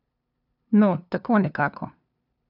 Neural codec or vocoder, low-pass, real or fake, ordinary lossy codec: codec, 16 kHz, 2 kbps, FunCodec, trained on LibriTTS, 25 frames a second; 5.4 kHz; fake; none